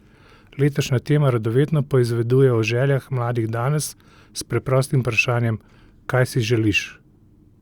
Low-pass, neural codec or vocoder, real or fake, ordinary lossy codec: 19.8 kHz; none; real; Opus, 64 kbps